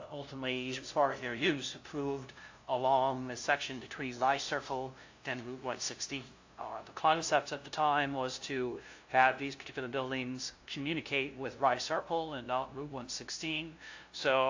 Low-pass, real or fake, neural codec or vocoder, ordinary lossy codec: 7.2 kHz; fake; codec, 16 kHz, 0.5 kbps, FunCodec, trained on LibriTTS, 25 frames a second; AAC, 48 kbps